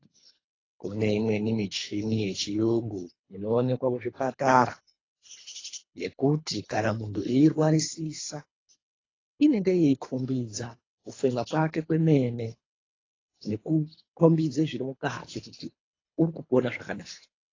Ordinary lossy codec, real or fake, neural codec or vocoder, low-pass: AAC, 32 kbps; fake; codec, 24 kHz, 3 kbps, HILCodec; 7.2 kHz